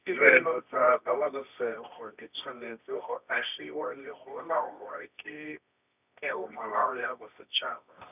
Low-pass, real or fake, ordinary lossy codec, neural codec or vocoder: 3.6 kHz; fake; none; codec, 24 kHz, 0.9 kbps, WavTokenizer, medium music audio release